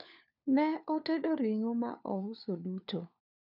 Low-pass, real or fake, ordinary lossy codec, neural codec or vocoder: 5.4 kHz; fake; none; codec, 16 kHz, 4 kbps, FunCodec, trained on LibriTTS, 50 frames a second